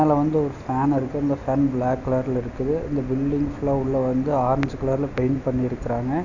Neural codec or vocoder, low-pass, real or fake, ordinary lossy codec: none; 7.2 kHz; real; none